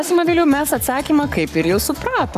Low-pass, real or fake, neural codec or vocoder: 14.4 kHz; fake; codec, 44.1 kHz, 7.8 kbps, Pupu-Codec